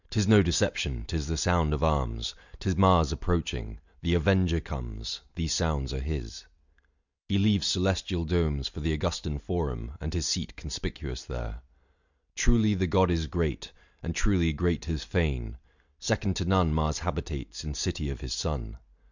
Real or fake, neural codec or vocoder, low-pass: real; none; 7.2 kHz